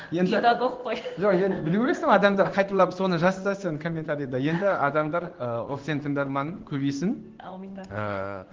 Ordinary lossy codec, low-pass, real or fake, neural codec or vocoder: Opus, 16 kbps; 7.2 kHz; fake; codec, 16 kHz in and 24 kHz out, 1 kbps, XY-Tokenizer